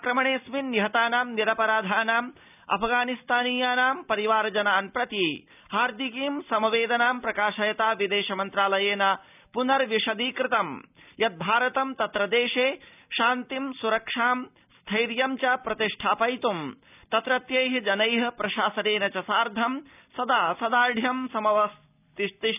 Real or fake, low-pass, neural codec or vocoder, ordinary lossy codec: real; 3.6 kHz; none; none